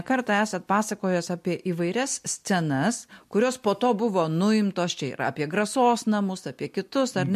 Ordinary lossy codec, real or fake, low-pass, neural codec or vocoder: MP3, 64 kbps; real; 14.4 kHz; none